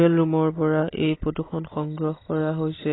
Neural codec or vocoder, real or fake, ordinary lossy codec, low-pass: none; real; AAC, 16 kbps; 7.2 kHz